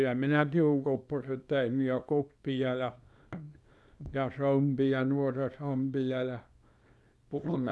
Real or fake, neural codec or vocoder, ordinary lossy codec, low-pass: fake; codec, 24 kHz, 0.9 kbps, WavTokenizer, small release; none; none